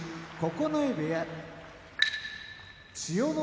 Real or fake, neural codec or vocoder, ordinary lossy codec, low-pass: real; none; none; none